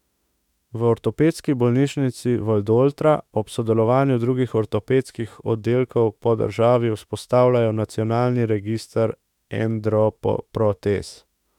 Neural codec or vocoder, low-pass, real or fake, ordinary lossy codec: autoencoder, 48 kHz, 32 numbers a frame, DAC-VAE, trained on Japanese speech; 19.8 kHz; fake; none